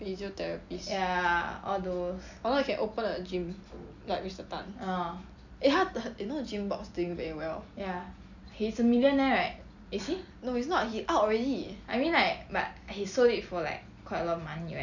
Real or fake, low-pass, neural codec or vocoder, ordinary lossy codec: real; 7.2 kHz; none; none